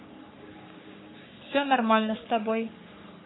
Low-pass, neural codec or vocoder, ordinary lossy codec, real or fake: 7.2 kHz; codec, 44.1 kHz, 3.4 kbps, Pupu-Codec; AAC, 16 kbps; fake